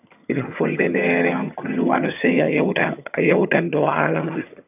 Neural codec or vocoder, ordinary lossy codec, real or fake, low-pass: vocoder, 22.05 kHz, 80 mel bands, HiFi-GAN; none; fake; 3.6 kHz